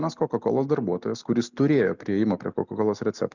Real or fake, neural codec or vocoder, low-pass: real; none; 7.2 kHz